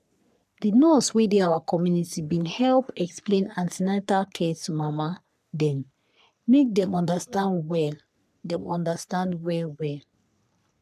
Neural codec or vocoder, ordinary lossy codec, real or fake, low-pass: codec, 44.1 kHz, 3.4 kbps, Pupu-Codec; none; fake; 14.4 kHz